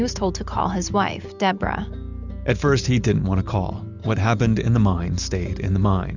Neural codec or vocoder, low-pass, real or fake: none; 7.2 kHz; real